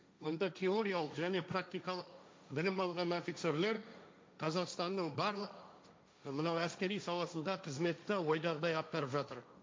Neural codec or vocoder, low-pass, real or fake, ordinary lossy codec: codec, 16 kHz, 1.1 kbps, Voila-Tokenizer; none; fake; none